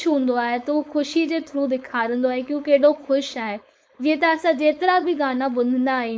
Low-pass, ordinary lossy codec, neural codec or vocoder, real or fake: none; none; codec, 16 kHz, 4.8 kbps, FACodec; fake